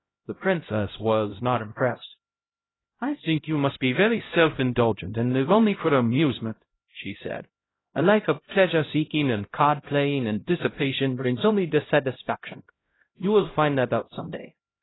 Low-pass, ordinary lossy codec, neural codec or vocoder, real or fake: 7.2 kHz; AAC, 16 kbps; codec, 16 kHz, 0.5 kbps, X-Codec, HuBERT features, trained on LibriSpeech; fake